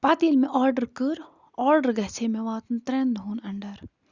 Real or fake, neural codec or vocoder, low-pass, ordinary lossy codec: real; none; 7.2 kHz; Opus, 64 kbps